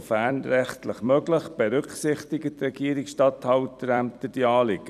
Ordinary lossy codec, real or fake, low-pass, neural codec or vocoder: none; fake; 14.4 kHz; vocoder, 44.1 kHz, 128 mel bands every 256 samples, BigVGAN v2